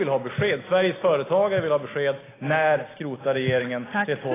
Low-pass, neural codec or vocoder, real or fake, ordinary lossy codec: 3.6 kHz; none; real; AAC, 16 kbps